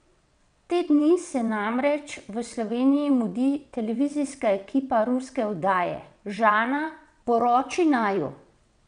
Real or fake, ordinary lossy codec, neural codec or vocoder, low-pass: fake; none; vocoder, 22.05 kHz, 80 mel bands, WaveNeXt; 9.9 kHz